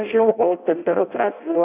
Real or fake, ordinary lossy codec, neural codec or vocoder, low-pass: fake; AAC, 32 kbps; codec, 16 kHz in and 24 kHz out, 0.6 kbps, FireRedTTS-2 codec; 3.6 kHz